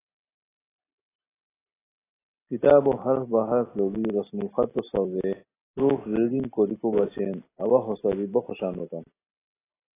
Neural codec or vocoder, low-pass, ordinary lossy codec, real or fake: none; 3.6 kHz; AAC, 16 kbps; real